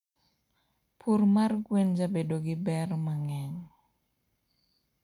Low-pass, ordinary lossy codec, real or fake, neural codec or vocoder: 19.8 kHz; none; real; none